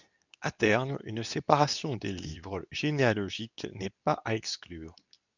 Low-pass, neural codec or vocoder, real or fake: 7.2 kHz; codec, 24 kHz, 0.9 kbps, WavTokenizer, medium speech release version 2; fake